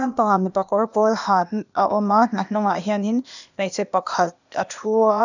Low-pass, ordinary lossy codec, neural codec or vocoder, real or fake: 7.2 kHz; none; codec, 16 kHz, 0.8 kbps, ZipCodec; fake